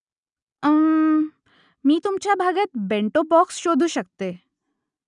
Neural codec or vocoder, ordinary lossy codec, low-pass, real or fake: none; none; 10.8 kHz; real